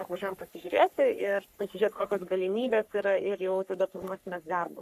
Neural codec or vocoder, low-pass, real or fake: codec, 44.1 kHz, 3.4 kbps, Pupu-Codec; 14.4 kHz; fake